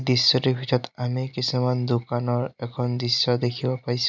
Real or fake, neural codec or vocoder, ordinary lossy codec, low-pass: real; none; none; 7.2 kHz